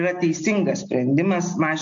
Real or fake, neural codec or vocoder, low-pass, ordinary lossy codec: real; none; 7.2 kHz; AAC, 64 kbps